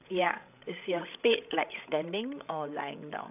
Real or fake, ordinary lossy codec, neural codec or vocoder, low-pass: fake; none; codec, 16 kHz, 16 kbps, FreqCodec, larger model; 3.6 kHz